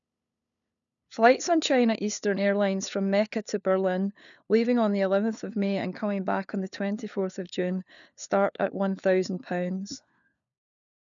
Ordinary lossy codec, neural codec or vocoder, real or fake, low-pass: none; codec, 16 kHz, 4 kbps, FunCodec, trained on LibriTTS, 50 frames a second; fake; 7.2 kHz